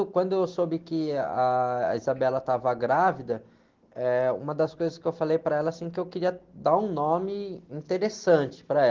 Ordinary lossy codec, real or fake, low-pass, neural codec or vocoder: Opus, 16 kbps; real; 7.2 kHz; none